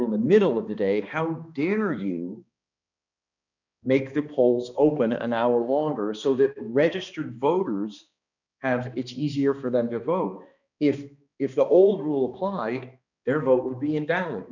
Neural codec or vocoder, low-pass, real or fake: codec, 16 kHz, 2 kbps, X-Codec, HuBERT features, trained on balanced general audio; 7.2 kHz; fake